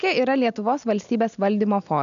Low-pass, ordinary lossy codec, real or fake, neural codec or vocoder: 7.2 kHz; AAC, 96 kbps; real; none